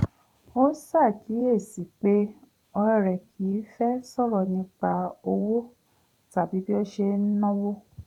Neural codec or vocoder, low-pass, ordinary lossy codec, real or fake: vocoder, 44.1 kHz, 128 mel bands every 512 samples, BigVGAN v2; 19.8 kHz; none; fake